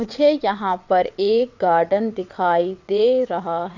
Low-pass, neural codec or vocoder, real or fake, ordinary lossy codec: 7.2 kHz; vocoder, 22.05 kHz, 80 mel bands, WaveNeXt; fake; none